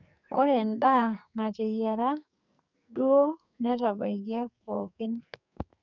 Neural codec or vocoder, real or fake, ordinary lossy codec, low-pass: codec, 44.1 kHz, 2.6 kbps, SNAC; fake; none; 7.2 kHz